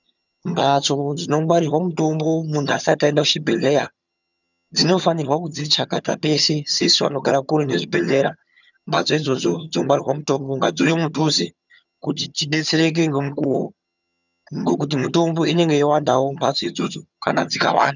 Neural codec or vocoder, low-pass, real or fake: vocoder, 22.05 kHz, 80 mel bands, HiFi-GAN; 7.2 kHz; fake